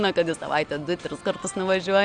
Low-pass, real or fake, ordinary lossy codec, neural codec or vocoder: 9.9 kHz; real; Opus, 64 kbps; none